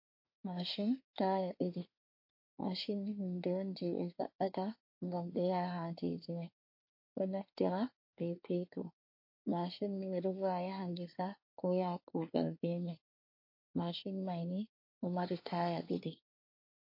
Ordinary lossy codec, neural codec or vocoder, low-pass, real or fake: MP3, 32 kbps; codec, 24 kHz, 1 kbps, SNAC; 5.4 kHz; fake